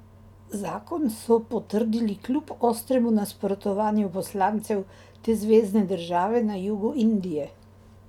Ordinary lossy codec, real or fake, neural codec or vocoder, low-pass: none; real; none; 19.8 kHz